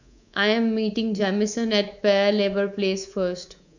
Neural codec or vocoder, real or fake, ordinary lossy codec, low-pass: codec, 24 kHz, 3.1 kbps, DualCodec; fake; none; 7.2 kHz